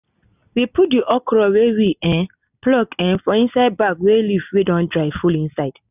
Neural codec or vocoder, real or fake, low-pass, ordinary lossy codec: none; real; 3.6 kHz; none